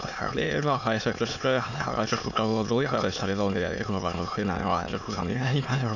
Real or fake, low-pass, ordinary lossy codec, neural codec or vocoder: fake; 7.2 kHz; none; autoencoder, 22.05 kHz, a latent of 192 numbers a frame, VITS, trained on many speakers